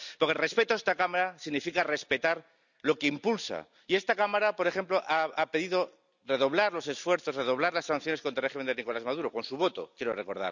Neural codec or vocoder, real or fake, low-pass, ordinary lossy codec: none; real; 7.2 kHz; none